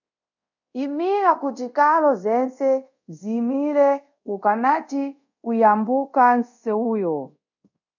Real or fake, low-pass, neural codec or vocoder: fake; 7.2 kHz; codec, 24 kHz, 0.5 kbps, DualCodec